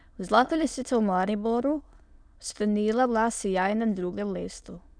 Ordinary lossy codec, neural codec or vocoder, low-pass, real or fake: none; autoencoder, 22.05 kHz, a latent of 192 numbers a frame, VITS, trained on many speakers; 9.9 kHz; fake